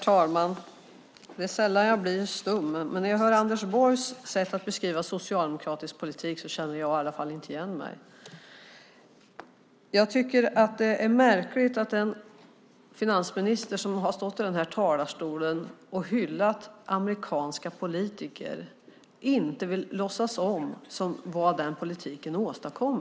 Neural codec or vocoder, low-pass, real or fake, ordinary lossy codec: none; none; real; none